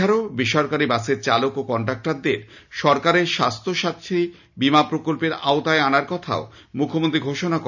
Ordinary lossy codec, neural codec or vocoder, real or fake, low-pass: none; none; real; 7.2 kHz